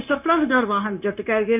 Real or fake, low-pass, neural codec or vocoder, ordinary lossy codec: fake; 3.6 kHz; codec, 16 kHz, 0.9 kbps, LongCat-Audio-Codec; none